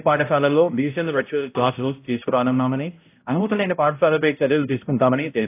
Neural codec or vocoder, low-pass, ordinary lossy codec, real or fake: codec, 16 kHz, 0.5 kbps, X-Codec, HuBERT features, trained on balanced general audio; 3.6 kHz; AAC, 24 kbps; fake